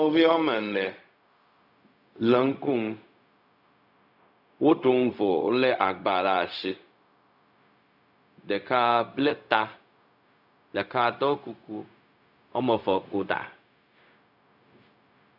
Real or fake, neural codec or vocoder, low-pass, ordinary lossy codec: fake; codec, 16 kHz, 0.4 kbps, LongCat-Audio-Codec; 5.4 kHz; AAC, 48 kbps